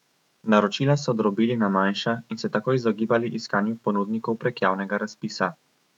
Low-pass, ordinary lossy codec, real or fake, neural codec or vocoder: 19.8 kHz; none; fake; autoencoder, 48 kHz, 128 numbers a frame, DAC-VAE, trained on Japanese speech